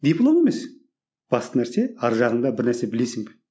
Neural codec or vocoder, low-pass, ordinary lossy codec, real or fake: none; none; none; real